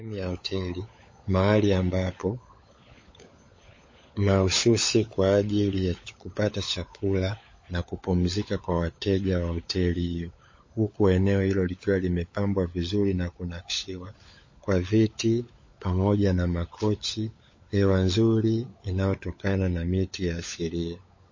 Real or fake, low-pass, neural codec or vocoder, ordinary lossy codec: fake; 7.2 kHz; codec, 16 kHz, 16 kbps, FunCodec, trained on LibriTTS, 50 frames a second; MP3, 32 kbps